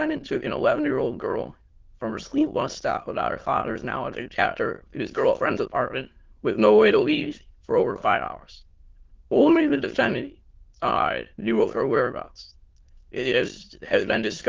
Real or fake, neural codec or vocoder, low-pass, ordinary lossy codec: fake; autoencoder, 22.05 kHz, a latent of 192 numbers a frame, VITS, trained on many speakers; 7.2 kHz; Opus, 16 kbps